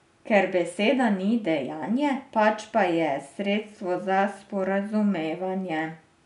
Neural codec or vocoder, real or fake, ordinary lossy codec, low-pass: none; real; none; 10.8 kHz